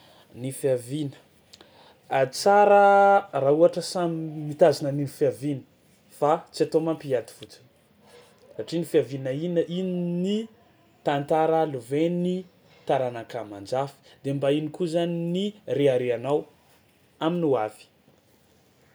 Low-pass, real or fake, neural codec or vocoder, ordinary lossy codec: none; real; none; none